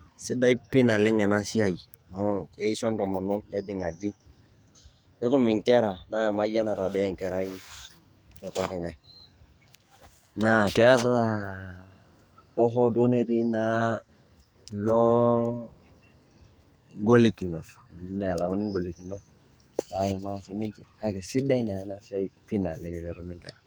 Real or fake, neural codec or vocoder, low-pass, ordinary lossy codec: fake; codec, 44.1 kHz, 2.6 kbps, SNAC; none; none